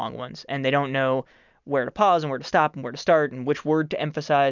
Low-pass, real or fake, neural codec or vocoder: 7.2 kHz; real; none